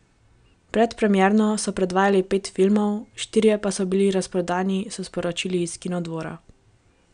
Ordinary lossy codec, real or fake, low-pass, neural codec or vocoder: none; real; 9.9 kHz; none